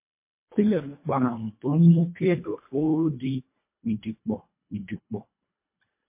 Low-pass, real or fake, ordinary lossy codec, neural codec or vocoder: 3.6 kHz; fake; MP3, 24 kbps; codec, 24 kHz, 1.5 kbps, HILCodec